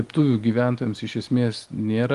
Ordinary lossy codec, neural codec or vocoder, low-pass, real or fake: Opus, 24 kbps; none; 10.8 kHz; real